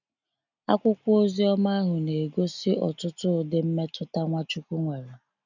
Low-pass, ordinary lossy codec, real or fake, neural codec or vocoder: 7.2 kHz; none; real; none